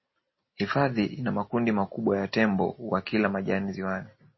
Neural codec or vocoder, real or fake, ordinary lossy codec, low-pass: none; real; MP3, 24 kbps; 7.2 kHz